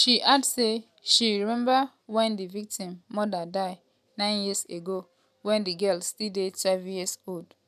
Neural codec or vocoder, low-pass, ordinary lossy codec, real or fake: none; 14.4 kHz; none; real